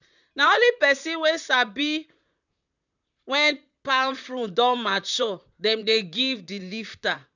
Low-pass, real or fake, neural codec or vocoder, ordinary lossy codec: 7.2 kHz; fake; vocoder, 44.1 kHz, 128 mel bands, Pupu-Vocoder; none